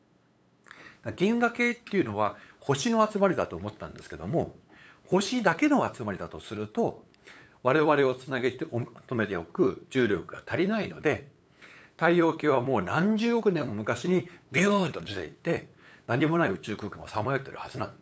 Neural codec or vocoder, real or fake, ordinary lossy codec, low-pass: codec, 16 kHz, 8 kbps, FunCodec, trained on LibriTTS, 25 frames a second; fake; none; none